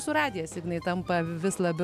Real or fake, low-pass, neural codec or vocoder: real; 14.4 kHz; none